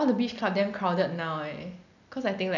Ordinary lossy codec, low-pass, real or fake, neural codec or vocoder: none; 7.2 kHz; real; none